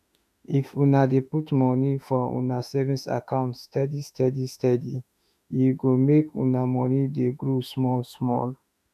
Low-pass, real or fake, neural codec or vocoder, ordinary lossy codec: 14.4 kHz; fake; autoencoder, 48 kHz, 32 numbers a frame, DAC-VAE, trained on Japanese speech; none